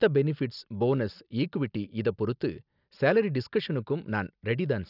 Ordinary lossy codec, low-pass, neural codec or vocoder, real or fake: none; 5.4 kHz; none; real